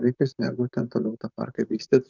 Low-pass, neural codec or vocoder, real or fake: 7.2 kHz; vocoder, 44.1 kHz, 128 mel bands, Pupu-Vocoder; fake